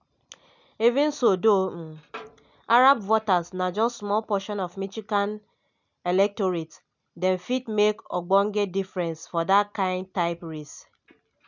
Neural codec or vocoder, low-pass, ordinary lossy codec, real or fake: none; 7.2 kHz; none; real